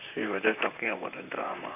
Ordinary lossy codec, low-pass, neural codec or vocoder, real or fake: none; 3.6 kHz; vocoder, 44.1 kHz, 128 mel bands, Pupu-Vocoder; fake